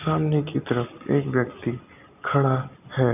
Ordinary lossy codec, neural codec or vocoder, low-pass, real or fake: none; none; 3.6 kHz; real